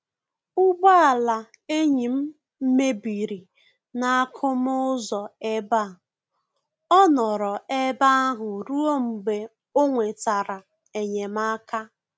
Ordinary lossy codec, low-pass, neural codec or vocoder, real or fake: none; none; none; real